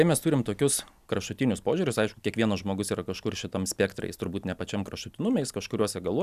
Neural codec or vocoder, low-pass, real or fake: none; 14.4 kHz; real